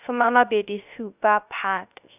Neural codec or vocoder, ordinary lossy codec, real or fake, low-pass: codec, 16 kHz, 0.3 kbps, FocalCodec; none; fake; 3.6 kHz